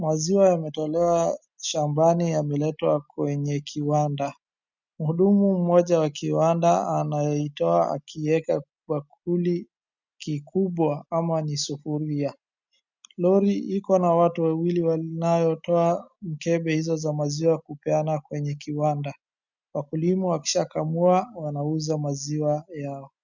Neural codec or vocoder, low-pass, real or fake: none; 7.2 kHz; real